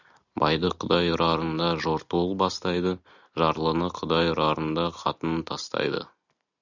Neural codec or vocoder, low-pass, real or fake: none; 7.2 kHz; real